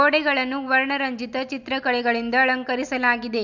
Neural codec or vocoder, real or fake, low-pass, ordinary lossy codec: none; real; 7.2 kHz; none